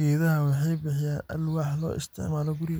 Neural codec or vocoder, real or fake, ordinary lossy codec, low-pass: none; real; none; none